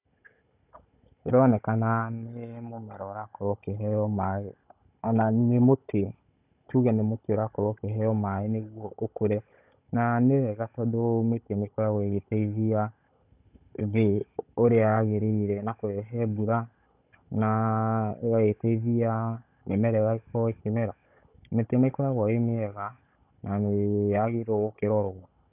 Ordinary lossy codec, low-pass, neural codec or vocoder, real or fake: none; 3.6 kHz; codec, 16 kHz, 16 kbps, FunCodec, trained on Chinese and English, 50 frames a second; fake